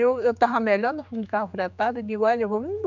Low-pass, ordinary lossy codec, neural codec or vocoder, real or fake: 7.2 kHz; none; codec, 16 kHz, 4 kbps, X-Codec, HuBERT features, trained on general audio; fake